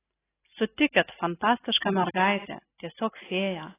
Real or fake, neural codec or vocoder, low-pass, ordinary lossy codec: real; none; 3.6 kHz; AAC, 16 kbps